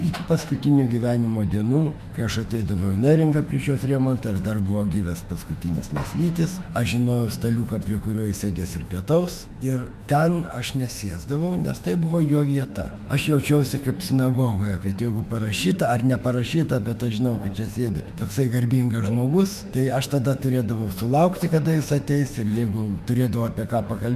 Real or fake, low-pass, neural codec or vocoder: fake; 14.4 kHz; autoencoder, 48 kHz, 32 numbers a frame, DAC-VAE, trained on Japanese speech